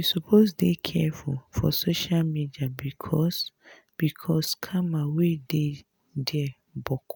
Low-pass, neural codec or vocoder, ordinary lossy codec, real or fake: none; none; none; real